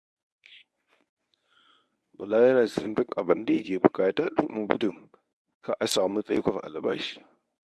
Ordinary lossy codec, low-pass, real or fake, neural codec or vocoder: none; none; fake; codec, 24 kHz, 0.9 kbps, WavTokenizer, medium speech release version 1